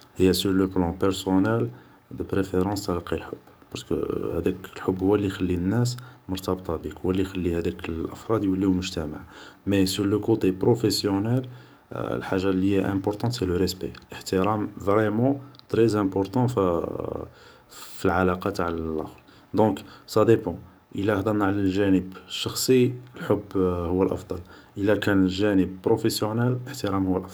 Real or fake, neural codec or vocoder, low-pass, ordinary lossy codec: fake; codec, 44.1 kHz, 7.8 kbps, DAC; none; none